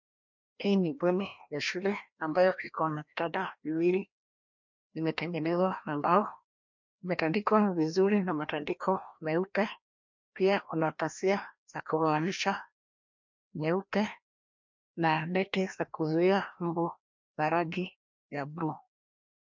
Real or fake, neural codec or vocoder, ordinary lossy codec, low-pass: fake; codec, 16 kHz, 1 kbps, FreqCodec, larger model; MP3, 64 kbps; 7.2 kHz